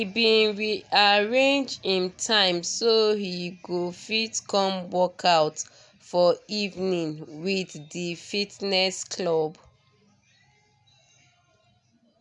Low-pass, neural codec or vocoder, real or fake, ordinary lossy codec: 10.8 kHz; none; real; none